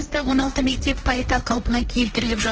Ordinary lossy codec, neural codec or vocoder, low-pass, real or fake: Opus, 16 kbps; codec, 16 kHz, 1.1 kbps, Voila-Tokenizer; 7.2 kHz; fake